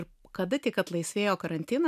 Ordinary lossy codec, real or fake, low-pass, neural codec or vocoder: AAC, 96 kbps; real; 14.4 kHz; none